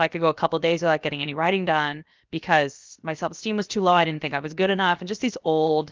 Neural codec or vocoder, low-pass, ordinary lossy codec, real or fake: codec, 16 kHz, 0.7 kbps, FocalCodec; 7.2 kHz; Opus, 32 kbps; fake